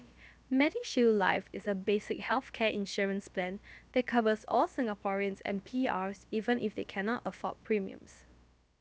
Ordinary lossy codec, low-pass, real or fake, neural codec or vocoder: none; none; fake; codec, 16 kHz, about 1 kbps, DyCAST, with the encoder's durations